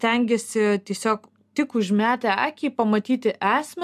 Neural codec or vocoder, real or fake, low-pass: none; real; 14.4 kHz